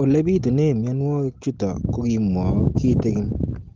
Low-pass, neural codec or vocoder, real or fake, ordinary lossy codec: 7.2 kHz; none; real; Opus, 16 kbps